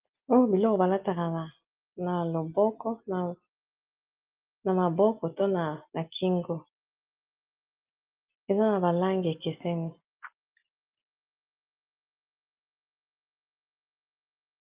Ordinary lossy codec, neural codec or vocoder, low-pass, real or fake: Opus, 32 kbps; none; 3.6 kHz; real